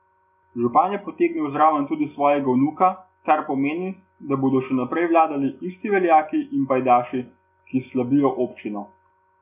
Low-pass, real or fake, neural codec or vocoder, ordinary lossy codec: 3.6 kHz; real; none; none